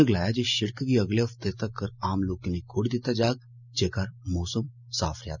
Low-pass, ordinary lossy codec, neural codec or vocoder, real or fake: 7.2 kHz; none; none; real